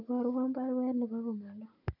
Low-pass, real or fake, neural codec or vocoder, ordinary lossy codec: 5.4 kHz; real; none; none